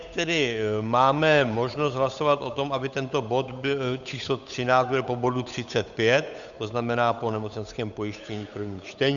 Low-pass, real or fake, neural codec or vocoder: 7.2 kHz; fake; codec, 16 kHz, 8 kbps, FunCodec, trained on Chinese and English, 25 frames a second